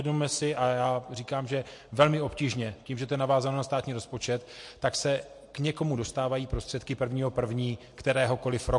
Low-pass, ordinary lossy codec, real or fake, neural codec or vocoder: 10.8 kHz; MP3, 48 kbps; real; none